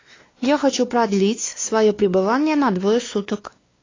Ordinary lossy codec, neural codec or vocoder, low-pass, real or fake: AAC, 32 kbps; codec, 16 kHz, 2 kbps, X-Codec, WavLM features, trained on Multilingual LibriSpeech; 7.2 kHz; fake